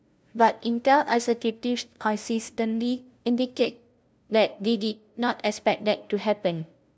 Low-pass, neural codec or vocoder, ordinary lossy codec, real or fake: none; codec, 16 kHz, 0.5 kbps, FunCodec, trained on LibriTTS, 25 frames a second; none; fake